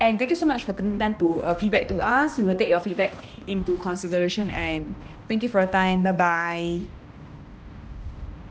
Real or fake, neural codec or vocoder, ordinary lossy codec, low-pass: fake; codec, 16 kHz, 1 kbps, X-Codec, HuBERT features, trained on balanced general audio; none; none